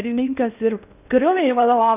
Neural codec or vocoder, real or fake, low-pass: codec, 16 kHz in and 24 kHz out, 0.6 kbps, FocalCodec, streaming, 4096 codes; fake; 3.6 kHz